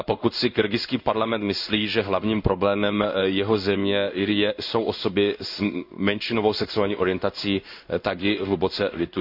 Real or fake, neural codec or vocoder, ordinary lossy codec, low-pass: fake; codec, 16 kHz in and 24 kHz out, 1 kbps, XY-Tokenizer; none; 5.4 kHz